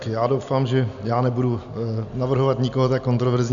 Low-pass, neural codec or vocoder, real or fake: 7.2 kHz; none; real